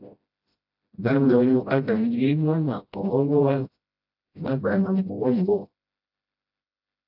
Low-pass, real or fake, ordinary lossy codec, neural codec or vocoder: 5.4 kHz; fake; AAC, 48 kbps; codec, 16 kHz, 0.5 kbps, FreqCodec, smaller model